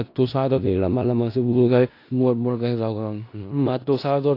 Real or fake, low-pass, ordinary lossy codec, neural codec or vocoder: fake; 5.4 kHz; AAC, 32 kbps; codec, 16 kHz in and 24 kHz out, 0.4 kbps, LongCat-Audio-Codec, four codebook decoder